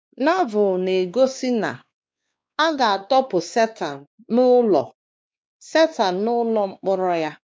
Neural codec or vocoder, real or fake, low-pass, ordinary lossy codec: codec, 16 kHz, 2 kbps, X-Codec, WavLM features, trained on Multilingual LibriSpeech; fake; none; none